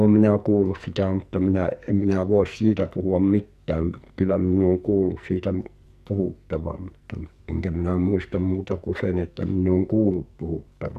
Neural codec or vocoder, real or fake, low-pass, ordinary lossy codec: codec, 44.1 kHz, 2.6 kbps, SNAC; fake; 14.4 kHz; none